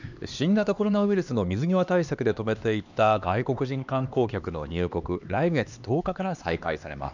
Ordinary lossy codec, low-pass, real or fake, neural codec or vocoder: none; 7.2 kHz; fake; codec, 16 kHz, 2 kbps, X-Codec, HuBERT features, trained on LibriSpeech